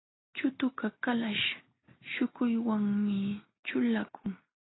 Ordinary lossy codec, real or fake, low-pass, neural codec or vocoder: AAC, 16 kbps; real; 7.2 kHz; none